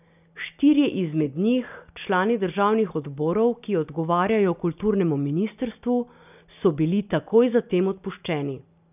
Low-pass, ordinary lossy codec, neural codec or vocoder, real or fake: 3.6 kHz; none; none; real